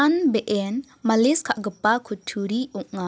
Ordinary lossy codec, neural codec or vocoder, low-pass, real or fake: none; none; none; real